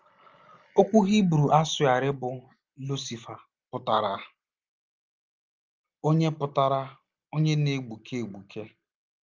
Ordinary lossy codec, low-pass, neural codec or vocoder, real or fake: Opus, 32 kbps; 7.2 kHz; none; real